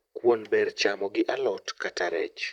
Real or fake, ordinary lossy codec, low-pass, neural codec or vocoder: fake; none; 19.8 kHz; vocoder, 44.1 kHz, 128 mel bands, Pupu-Vocoder